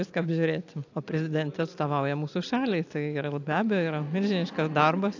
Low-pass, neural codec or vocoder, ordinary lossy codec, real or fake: 7.2 kHz; none; AAC, 48 kbps; real